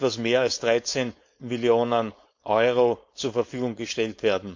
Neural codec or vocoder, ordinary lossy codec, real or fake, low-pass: codec, 16 kHz, 4.8 kbps, FACodec; MP3, 48 kbps; fake; 7.2 kHz